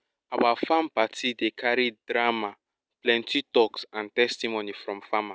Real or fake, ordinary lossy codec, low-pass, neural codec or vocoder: real; none; none; none